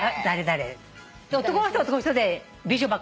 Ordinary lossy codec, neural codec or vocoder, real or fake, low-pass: none; none; real; none